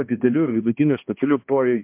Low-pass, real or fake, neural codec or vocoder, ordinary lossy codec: 3.6 kHz; fake; codec, 16 kHz, 1 kbps, X-Codec, HuBERT features, trained on balanced general audio; MP3, 32 kbps